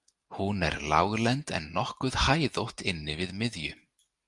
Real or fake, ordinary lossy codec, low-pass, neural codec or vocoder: real; Opus, 32 kbps; 10.8 kHz; none